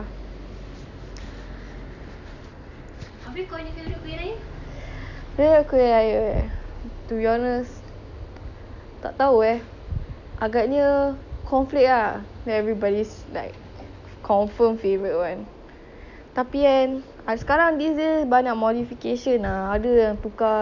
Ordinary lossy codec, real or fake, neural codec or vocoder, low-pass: none; real; none; 7.2 kHz